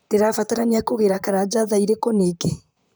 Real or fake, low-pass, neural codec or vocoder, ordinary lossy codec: fake; none; vocoder, 44.1 kHz, 128 mel bands, Pupu-Vocoder; none